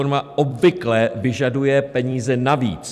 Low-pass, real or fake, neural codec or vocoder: 14.4 kHz; fake; vocoder, 44.1 kHz, 128 mel bands every 256 samples, BigVGAN v2